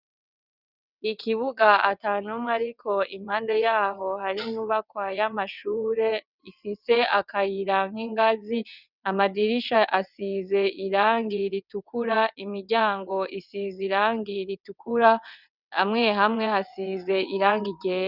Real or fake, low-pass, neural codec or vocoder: fake; 5.4 kHz; vocoder, 22.05 kHz, 80 mel bands, WaveNeXt